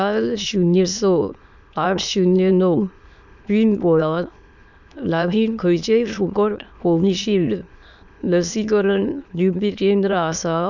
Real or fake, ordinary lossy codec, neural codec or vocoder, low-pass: fake; none; autoencoder, 22.05 kHz, a latent of 192 numbers a frame, VITS, trained on many speakers; 7.2 kHz